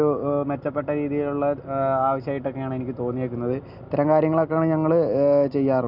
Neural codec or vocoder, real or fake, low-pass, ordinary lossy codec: none; real; 5.4 kHz; none